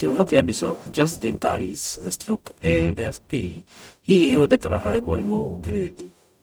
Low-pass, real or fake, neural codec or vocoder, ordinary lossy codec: none; fake; codec, 44.1 kHz, 0.9 kbps, DAC; none